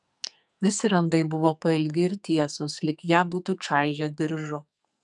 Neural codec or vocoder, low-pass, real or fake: codec, 44.1 kHz, 2.6 kbps, SNAC; 10.8 kHz; fake